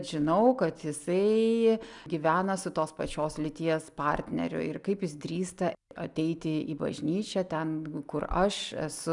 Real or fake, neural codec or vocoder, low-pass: real; none; 10.8 kHz